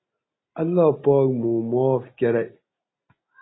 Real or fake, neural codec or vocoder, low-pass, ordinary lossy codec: real; none; 7.2 kHz; AAC, 16 kbps